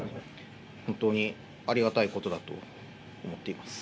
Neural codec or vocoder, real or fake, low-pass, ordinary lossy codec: none; real; none; none